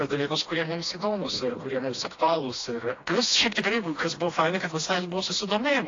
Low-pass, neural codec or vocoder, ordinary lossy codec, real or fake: 7.2 kHz; codec, 16 kHz, 1 kbps, FreqCodec, smaller model; AAC, 32 kbps; fake